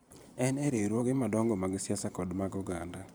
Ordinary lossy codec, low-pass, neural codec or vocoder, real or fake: none; none; vocoder, 44.1 kHz, 128 mel bands every 512 samples, BigVGAN v2; fake